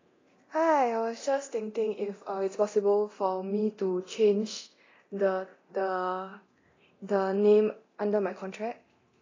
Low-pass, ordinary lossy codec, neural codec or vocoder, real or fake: 7.2 kHz; AAC, 32 kbps; codec, 24 kHz, 0.9 kbps, DualCodec; fake